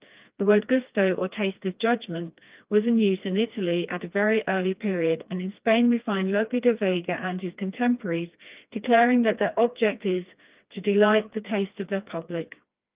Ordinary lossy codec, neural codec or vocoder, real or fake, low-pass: Opus, 24 kbps; codec, 16 kHz, 2 kbps, FreqCodec, smaller model; fake; 3.6 kHz